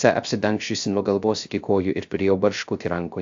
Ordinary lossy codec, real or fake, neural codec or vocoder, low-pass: AAC, 64 kbps; fake; codec, 16 kHz, 0.3 kbps, FocalCodec; 7.2 kHz